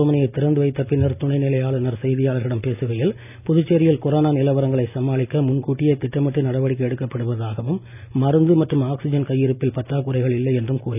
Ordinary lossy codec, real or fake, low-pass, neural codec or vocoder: none; real; 3.6 kHz; none